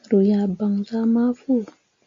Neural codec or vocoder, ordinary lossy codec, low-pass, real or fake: none; MP3, 48 kbps; 7.2 kHz; real